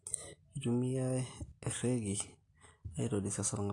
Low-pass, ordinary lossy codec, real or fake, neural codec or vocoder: 10.8 kHz; AAC, 48 kbps; real; none